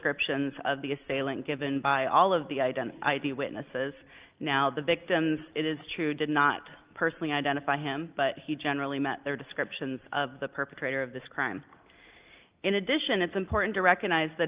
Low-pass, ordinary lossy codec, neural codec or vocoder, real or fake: 3.6 kHz; Opus, 32 kbps; none; real